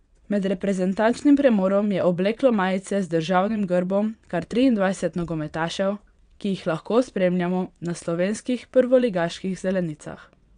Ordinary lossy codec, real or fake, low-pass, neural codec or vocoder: none; fake; 9.9 kHz; vocoder, 22.05 kHz, 80 mel bands, WaveNeXt